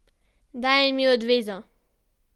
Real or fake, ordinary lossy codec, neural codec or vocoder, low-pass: real; Opus, 24 kbps; none; 19.8 kHz